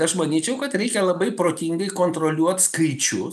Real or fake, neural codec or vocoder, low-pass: real; none; 14.4 kHz